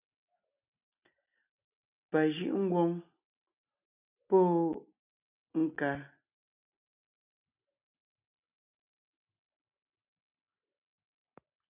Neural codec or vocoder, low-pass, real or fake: none; 3.6 kHz; real